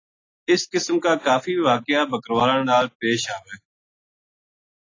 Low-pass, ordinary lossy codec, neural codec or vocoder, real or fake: 7.2 kHz; AAC, 32 kbps; none; real